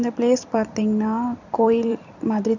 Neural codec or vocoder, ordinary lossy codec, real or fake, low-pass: vocoder, 44.1 kHz, 128 mel bands every 256 samples, BigVGAN v2; none; fake; 7.2 kHz